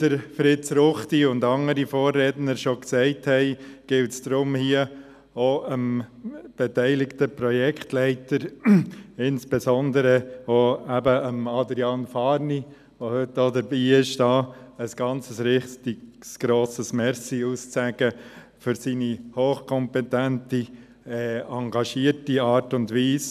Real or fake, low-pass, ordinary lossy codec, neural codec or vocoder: real; 14.4 kHz; none; none